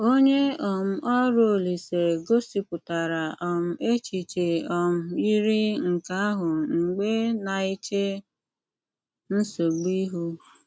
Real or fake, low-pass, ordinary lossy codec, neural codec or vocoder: real; none; none; none